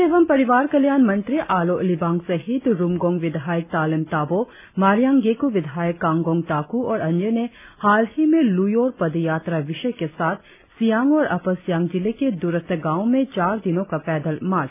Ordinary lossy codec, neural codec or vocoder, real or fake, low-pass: none; none; real; 3.6 kHz